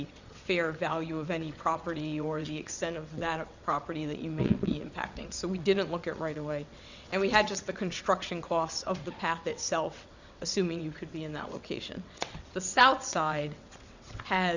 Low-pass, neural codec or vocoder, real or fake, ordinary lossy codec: 7.2 kHz; vocoder, 22.05 kHz, 80 mel bands, WaveNeXt; fake; Opus, 64 kbps